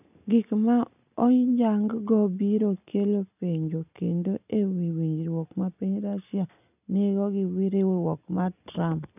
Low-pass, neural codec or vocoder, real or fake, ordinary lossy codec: 3.6 kHz; none; real; none